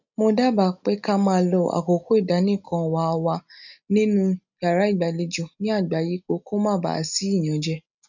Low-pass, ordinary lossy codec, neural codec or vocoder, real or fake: 7.2 kHz; none; none; real